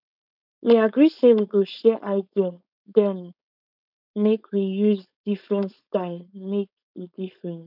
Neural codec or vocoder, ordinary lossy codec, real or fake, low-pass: codec, 16 kHz, 4.8 kbps, FACodec; none; fake; 5.4 kHz